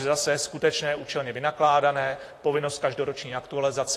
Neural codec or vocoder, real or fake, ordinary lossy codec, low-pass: vocoder, 44.1 kHz, 128 mel bands, Pupu-Vocoder; fake; AAC, 48 kbps; 14.4 kHz